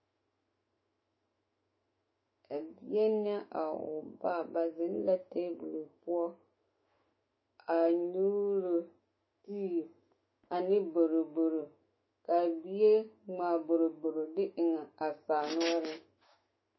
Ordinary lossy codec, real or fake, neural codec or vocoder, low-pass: MP3, 24 kbps; fake; autoencoder, 48 kHz, 128 numbers a frame, DAC-VAE, trained on Japanese speech; 7.2 kHz